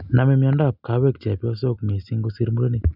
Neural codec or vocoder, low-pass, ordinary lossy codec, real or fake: none; 5.4 kHz; none; real